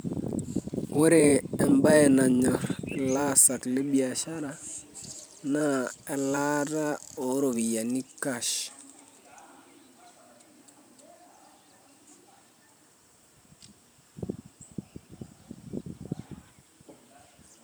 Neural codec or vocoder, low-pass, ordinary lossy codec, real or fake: vocoder, 44.1 kHz, 128 mel bands every 512 samples, BigVGAN v2; none; none; fake